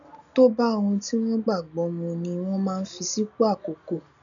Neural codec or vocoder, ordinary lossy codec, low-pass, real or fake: none; none; 7.2 kHz; real